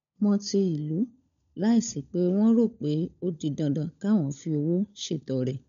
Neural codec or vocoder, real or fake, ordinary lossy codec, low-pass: codec, 16 kHz, 16 kbps, FunCodec, trained on LibriTTS, 50 frames a second; fake; none; 7.2 kHz